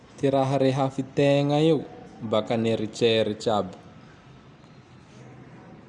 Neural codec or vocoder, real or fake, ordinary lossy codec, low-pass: none; real; none; 10.8 kHz